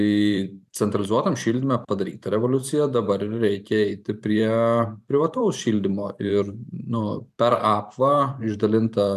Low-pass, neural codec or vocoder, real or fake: 14.4 kHz; vocoder, 44.1 kHz, 128 mel bands every 512 samples, BigVGAN v2; fake